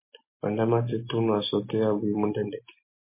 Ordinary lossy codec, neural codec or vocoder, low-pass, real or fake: MP3, 24 kbps; none; 3.6 kHz; real